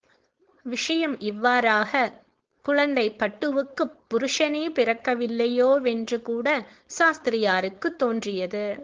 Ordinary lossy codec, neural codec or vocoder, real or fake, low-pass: Opus, 16 kbps; codec, 16 kHz, 4.8 kbps, FACodec; fake; 7.2 kHz